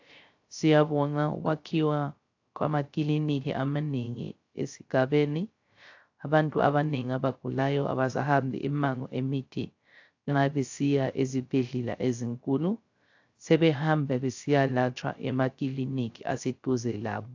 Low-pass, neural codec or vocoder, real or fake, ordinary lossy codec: 7.2 kHz; codec, 16 kHz, 0.3 kbps, FocalCodec; fake; AAC, 48 kbps